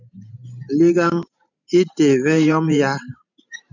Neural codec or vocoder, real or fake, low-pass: vocoder, 44.1 kHz, 128 mel bands every 512 samples, BigVGAN v2; fake; 7.2 kHz